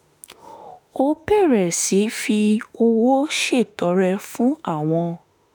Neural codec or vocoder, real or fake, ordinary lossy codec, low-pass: autoencoder, 48 kHz, 32 numbers a frame, DAC-VAE, trained on Japanese speech; fake; none; none